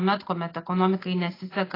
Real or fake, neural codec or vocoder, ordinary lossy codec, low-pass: real; none; AAC, 24 kbps; 5.4 kHz